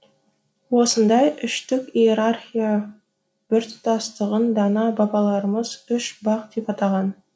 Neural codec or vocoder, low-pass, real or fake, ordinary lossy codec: none; none; real; none